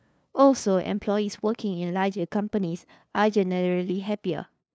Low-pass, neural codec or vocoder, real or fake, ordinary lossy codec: none; codec, 16 kHz, 2 kbps, FunCodec, trained on LibriTTS, 25 frames a second; fake; none